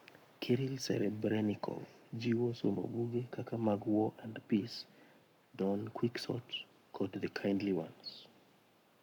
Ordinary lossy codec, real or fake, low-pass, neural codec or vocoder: none; fake; 19.8 kHz; codec, 44.1 kHz, 7.8 kbps, Pupu-Codec